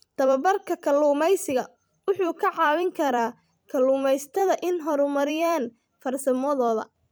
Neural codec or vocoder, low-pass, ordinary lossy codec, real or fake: vocoder, 44.1 kHz, 128 mel bands every 256 samples, BigVGAN v2; none; none; fake